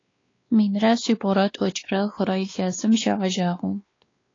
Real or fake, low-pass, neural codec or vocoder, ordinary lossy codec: fake; 7.2 kHz; codec, 16 kHz, 2 kbps, X-Codec, WavLM features, trained on Multilingual LibriSpeech; AAC, 32 kbps